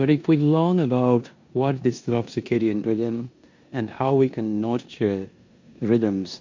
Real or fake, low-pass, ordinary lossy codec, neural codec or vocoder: fake; 7.2 kHz; MP3, 48 kbps; codec, 16 kHz in and 24 kHz out, 0.9 kbps, LongCat-Audio-Codec, four codebook decoder